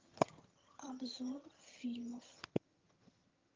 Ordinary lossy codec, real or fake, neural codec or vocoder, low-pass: Opus, 24 kbps; fake; vocoder, 22.05 kHz, 80 mel bands, HiFi-GAN; 7.2 kHz